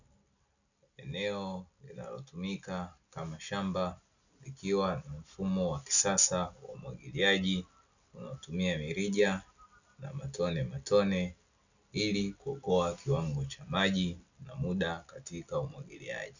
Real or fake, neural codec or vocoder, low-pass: real; none; 7.2 kHz